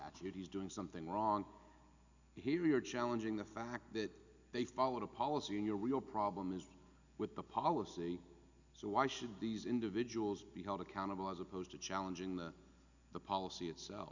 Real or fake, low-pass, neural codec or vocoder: real; 7.2 kHz; none